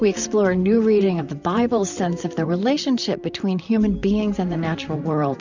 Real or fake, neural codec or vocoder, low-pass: fake; vocoder, 44.1 kHz, 128 mel bands, Pupu-Vocoder; 7.2 kHz